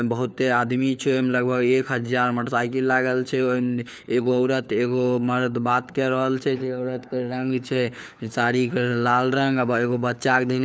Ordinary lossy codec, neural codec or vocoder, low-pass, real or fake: none; codec, 16 kHz, 4 kbps, FunCodec, trained on LibriTTS, 50 frames a second; none; fake